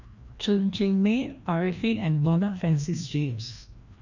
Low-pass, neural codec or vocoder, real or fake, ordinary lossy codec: 7.2 kHz; codec, 16 kHz, 1 kbps, FreqCodec, larger model; fake; none